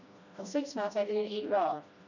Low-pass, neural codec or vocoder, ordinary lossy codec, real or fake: 7.2 kHz; codec, 16 kHz, 1 kbps, FreqCodec, smaller model; none; fake